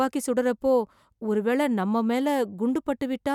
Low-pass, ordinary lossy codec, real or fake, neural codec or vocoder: 19.8 kHz; none; real; none